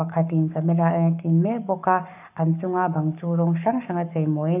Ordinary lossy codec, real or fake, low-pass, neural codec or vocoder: none; fake; 3.6 kHz; codec, 44.1 kHz, 7.8 kbps, Pupu-Codec